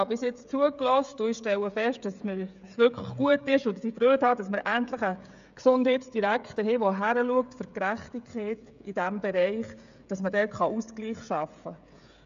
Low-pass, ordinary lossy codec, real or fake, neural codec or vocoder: 7.2 kHz; AAC, 96 kbps; fake; codec, 16 kHz, 16 kbps, FreqCodec, smaller model